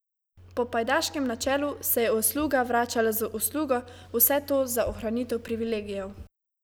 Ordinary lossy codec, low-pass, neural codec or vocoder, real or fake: none; none; none; real